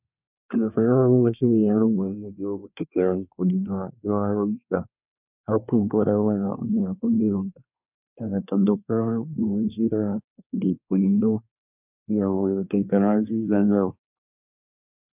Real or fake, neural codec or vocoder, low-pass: fake; codec, 24 kHz, 1 kbps, SNAC; 3.6 kHz